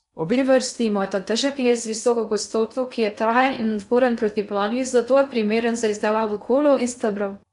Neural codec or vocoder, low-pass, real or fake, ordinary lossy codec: codec, 16 kHz in and 24 kHz out, 0.6 kbps, FocalCodec, streaming, 2048 codes; 10.8 kHz; fake; none